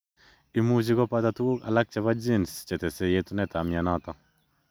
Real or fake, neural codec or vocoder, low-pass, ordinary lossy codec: real; none; none; none